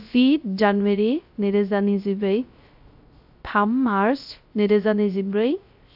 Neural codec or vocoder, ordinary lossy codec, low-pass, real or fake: codec, 16 kHz, 0.3 kbps, FocalCodec; AAC, 48 kbps; 5.4 kHz; fake